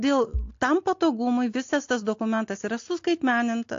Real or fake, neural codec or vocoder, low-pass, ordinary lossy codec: real; none; 7.2 kHz; AAC, 48 kbps